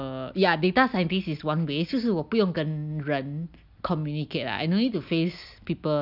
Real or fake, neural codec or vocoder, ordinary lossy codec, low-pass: real; none; none; 5.4 kHz